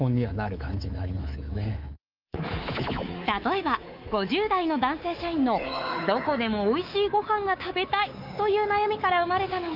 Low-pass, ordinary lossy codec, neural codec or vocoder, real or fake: 5.4 kHz; Opus, 24 kbps; codec, 24 kHz, 3.1 kbps, DualCodec; fake